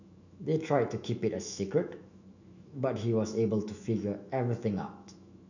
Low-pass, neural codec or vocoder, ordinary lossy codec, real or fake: 7.2 kHz; autoencoder, 48 kHz, 128 numbers a frame, DAC-VAE, trained on Japanese speech; none; fake